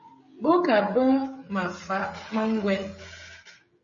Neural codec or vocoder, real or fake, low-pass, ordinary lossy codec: codec, 16 kHz, 16 kbps, FreqCodec, smaller model; fake; 7.2 kHz; MP3, 32 kbps